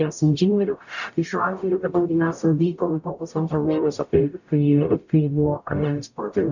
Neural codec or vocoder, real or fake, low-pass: codec, 44.1 kHz, 0.9 kbps, DAC; fake; 7.2 kHz